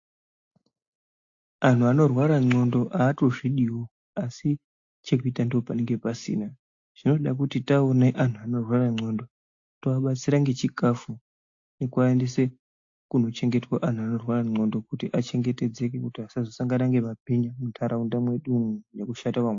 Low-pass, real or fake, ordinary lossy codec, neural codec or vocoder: 7.2 kHz; real; AAC, 48 kbps; none